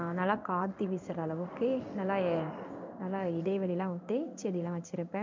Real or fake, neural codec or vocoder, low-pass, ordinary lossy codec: fake; codec, 16 kHz in and 24 kHz out, 1 kbps, XY-Tokenizer; 7.2 kHz; MP3, 64 kbps